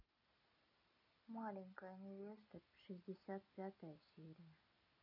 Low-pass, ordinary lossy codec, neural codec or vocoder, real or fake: 5.4 kHz; none; none; real